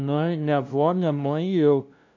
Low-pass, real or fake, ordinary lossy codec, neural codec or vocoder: 7.2 kHz; fake; MP3, 64 kbps; codec, 16 kHz, 0.5 kbps, FunCodec, trained on LibriTTS, 25 frames a second